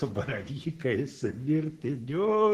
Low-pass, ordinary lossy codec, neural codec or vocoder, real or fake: 14.4 kHz; Opus, 16 kbps; codec, 44.1 kHz, 3.4 kbps, Pupu-Codec; fake